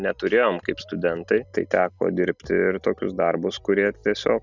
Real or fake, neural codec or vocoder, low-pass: real; none; 7.2 kHz